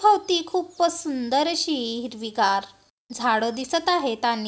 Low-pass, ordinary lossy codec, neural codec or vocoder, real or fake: none; none; none; real